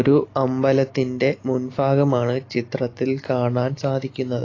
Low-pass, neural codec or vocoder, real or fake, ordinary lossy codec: 7.2 kHz; none; real; AAC, 32 kbps